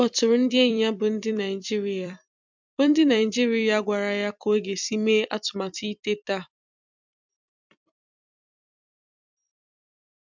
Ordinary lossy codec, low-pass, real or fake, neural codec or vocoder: MP3, 64 kbps; 7.2 kHz; fake; vocoder, 44.1 kHz, 128 mel bands every 256 samples, BigVGAN v2